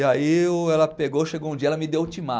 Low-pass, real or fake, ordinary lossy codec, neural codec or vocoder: none; real; none; none